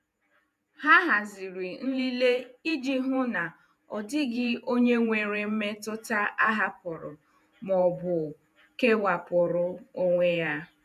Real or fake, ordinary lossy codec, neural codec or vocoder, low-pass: fake; none; vocoder, 48 kHz, 128 mel bands, Vocos; 14.4 kHz